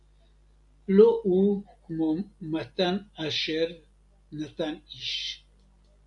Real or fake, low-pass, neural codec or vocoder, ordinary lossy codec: real; 10.8 kHz; none; AAC, 64 kbps